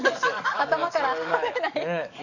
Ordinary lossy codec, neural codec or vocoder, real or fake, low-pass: none; codec, 44.1 kHz, 7.8 kbps, DAC; fake; 7.2 kHz